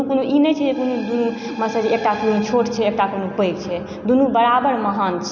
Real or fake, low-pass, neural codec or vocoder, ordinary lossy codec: real; 7.2 kHz; none; none